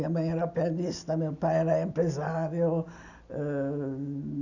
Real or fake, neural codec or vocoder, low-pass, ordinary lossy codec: real; none; 7.2 kHz; none